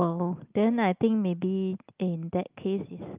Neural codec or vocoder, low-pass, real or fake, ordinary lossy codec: none; 3.6 kHz; real; Opus, 24 kbps